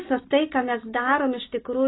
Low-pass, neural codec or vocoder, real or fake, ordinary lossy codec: 7.2 kHz; none; real; AAC, 16 kbps